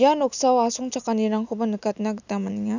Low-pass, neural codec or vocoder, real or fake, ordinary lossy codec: 7.2 kHz; none; real; none